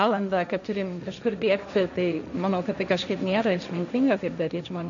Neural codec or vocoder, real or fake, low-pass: codec, 16 kHz, 1.1 kbps, Voila-Tokenizer; fake; 7.2 kHz